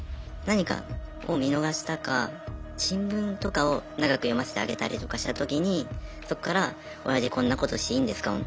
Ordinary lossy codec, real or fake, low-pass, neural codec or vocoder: none; real; none; none